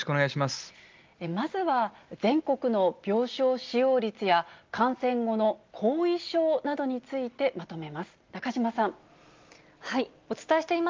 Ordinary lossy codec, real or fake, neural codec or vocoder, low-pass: Opus, 32 kbps; real; none; 7.2 kHz